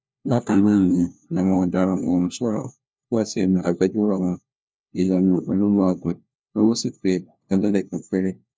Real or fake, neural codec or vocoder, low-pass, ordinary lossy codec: fake; codec, 16 kHz, 1 kbps, FunCodec, trained on LibriTTS, 50 frames a second; none; none